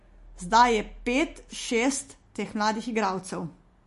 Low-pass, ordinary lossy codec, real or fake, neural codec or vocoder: 14.4 kHz; MP3, 48 kbps; real; none